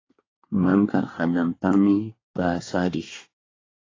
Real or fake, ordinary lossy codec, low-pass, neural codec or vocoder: fake; AAC, 32 kbps; 7.2 kHz; codec, 24 kHz, 1 kbps, SNAC